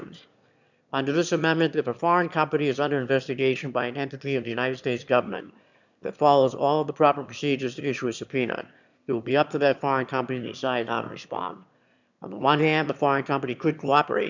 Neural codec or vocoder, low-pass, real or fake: autoencoder, 22.05 kHz, a latent of 192 numbers a frame, VITS, trained on one speaker; 7.2 kHz; fake